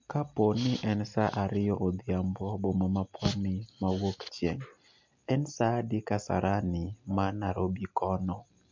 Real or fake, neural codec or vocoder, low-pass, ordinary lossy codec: real; none; 7.2 kHz; MP3, 48 kbps